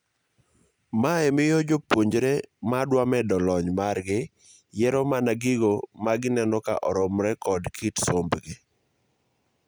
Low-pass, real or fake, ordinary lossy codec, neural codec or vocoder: none; real; none; none